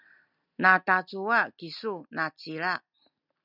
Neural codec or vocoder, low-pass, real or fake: none; 5.4 kHz; real